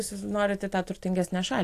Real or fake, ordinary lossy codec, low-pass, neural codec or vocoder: real; AAC, 64 kbps; 14.4 kHz; none